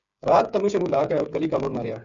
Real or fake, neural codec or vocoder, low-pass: fake; codec, 16 kHz, 8 kbps, FreqCodec, smaller model; 7.2 kHz